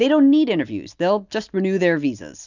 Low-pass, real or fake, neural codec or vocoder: 7.2 kHz; real; none